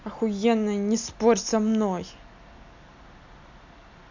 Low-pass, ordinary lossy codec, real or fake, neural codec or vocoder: 7.2 kHz; MP3, 64 kbps; real; none